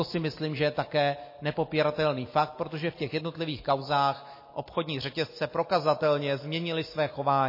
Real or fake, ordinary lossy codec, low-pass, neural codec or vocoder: real; MP3, 24 kbps; 5.4 kHz; none